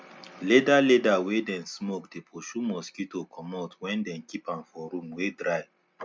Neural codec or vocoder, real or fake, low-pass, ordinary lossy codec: none; real; none; none